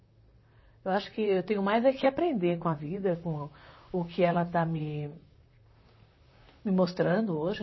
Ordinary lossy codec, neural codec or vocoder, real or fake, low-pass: MP3, 24 kbps; vocoder, 44.1 kHz, 128 mel bands, Pupu-Vocoder; fake; 7.2 kHz